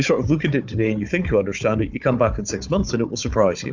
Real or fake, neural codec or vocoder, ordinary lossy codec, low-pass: fake; codec, 16 kHz, 4 kbps, FunCodec, trained on Chinese and English, 50 frames a second; MP3, 64 kbps; 7.2 kHz